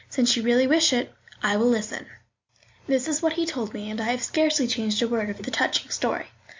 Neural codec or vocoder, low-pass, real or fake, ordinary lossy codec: none; 7.2 kHz; real; MP3, 64 kbps